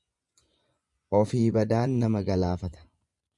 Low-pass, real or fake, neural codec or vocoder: 10.8 kHz; fake; vocoder, 24 kHz, 100 mel bands, Vocos